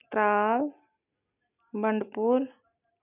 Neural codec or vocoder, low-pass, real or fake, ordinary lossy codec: none; 3.6 kHz; real; none